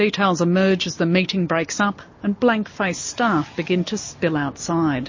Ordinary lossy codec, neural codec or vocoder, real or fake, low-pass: MP3, 32 kbps; none; real; 7.2 kHz